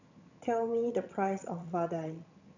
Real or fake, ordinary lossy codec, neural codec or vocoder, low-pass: fake; none; vocoder, 22.05 kHz, 80 mel bands, HiFi-GAN; 7.2 kHz